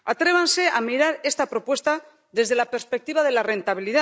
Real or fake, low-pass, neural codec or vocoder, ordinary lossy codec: real; none; none; none